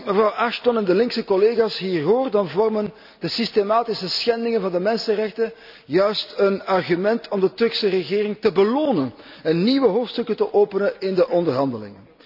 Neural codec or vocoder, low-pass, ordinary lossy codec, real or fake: none; 5.4 kHz; none; real